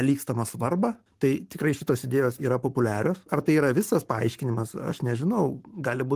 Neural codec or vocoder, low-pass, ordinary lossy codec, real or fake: codec, 44.1 kHz, 7.8 kbps, Pupu-Codec; 14.4 kHz; Opus, 24 kbps; fake